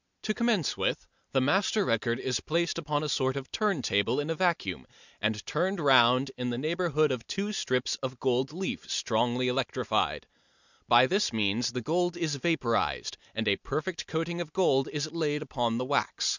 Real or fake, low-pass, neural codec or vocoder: real; 7.2 kHz; none